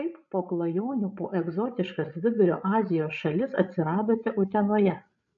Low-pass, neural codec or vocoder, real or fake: 7.2 kHz; codec, 16 kHz, 16 kbps, FreqCodec, larger model; fake